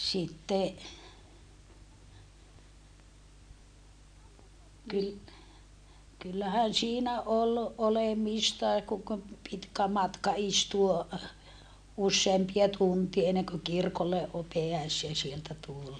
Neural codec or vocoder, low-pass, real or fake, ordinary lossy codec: none; 9.9 kHz; real; none